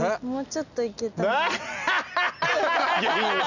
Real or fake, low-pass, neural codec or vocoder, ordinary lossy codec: real; 7.2 kHz; none; none